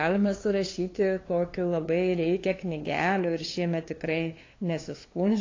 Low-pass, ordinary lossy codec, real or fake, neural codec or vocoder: 7.2 kHz; AAC, 32 kbps; fake; codec, 16 kHz, 2 kbps, FunCodec, trained on LibriTTS, 25 frames a second